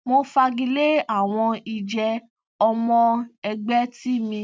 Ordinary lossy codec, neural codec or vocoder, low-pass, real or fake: none; none; none; real